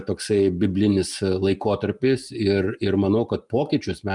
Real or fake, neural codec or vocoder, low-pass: real; none; 10.8 kHz